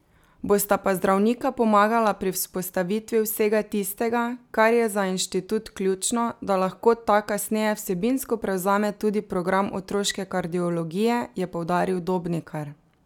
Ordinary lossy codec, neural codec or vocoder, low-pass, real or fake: none; none; 19.8 kHz; real